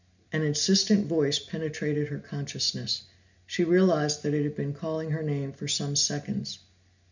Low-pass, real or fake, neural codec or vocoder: 7.2 kHz; real; none